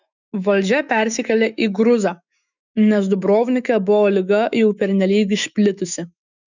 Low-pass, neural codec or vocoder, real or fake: 7.2 kHz; autoencoder, 48 kHz, 128 numbers a frame, DAC-VAE, trained on Japanese speech; fake